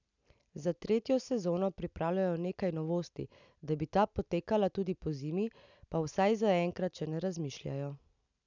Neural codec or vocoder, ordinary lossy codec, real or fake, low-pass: none; none; real; 7.2 kHz